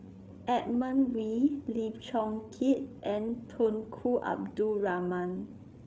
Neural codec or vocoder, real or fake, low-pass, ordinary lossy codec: codec, 16 kHz, 8 kbps, FreqCodec, larger model; fake; none; none